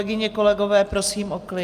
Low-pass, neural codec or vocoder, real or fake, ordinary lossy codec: 14.4 kHz; none; real; Opus, 32 kbps